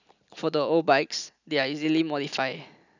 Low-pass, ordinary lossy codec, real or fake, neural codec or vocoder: 7.2 kHz; none; real; none